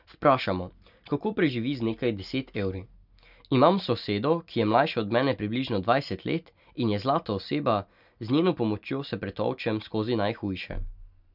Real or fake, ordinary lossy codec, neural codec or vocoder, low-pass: real; none; none; 5.4 kHz